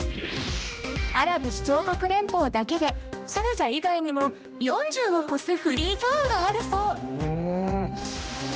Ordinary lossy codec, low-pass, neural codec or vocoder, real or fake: none; none; codec, 16 kHz, 1 kbps, X-Codec, HuBERT features, trained on general audio; fake